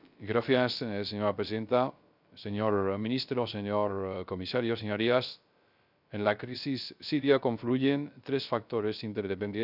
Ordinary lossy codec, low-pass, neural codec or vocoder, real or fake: none; 5.4 kHz; codec, 16 kHz, 0.3 kbps, FocalCodec; fake